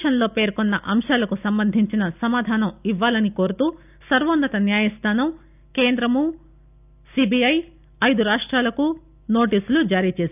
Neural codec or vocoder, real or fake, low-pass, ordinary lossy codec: none; real; 3.6 kHz; none